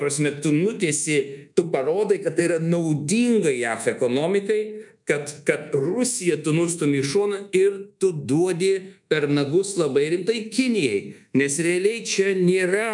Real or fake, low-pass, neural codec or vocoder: fake; 10.8 kHz; codec, 24 kHz, 1.2 kbps, DualCodec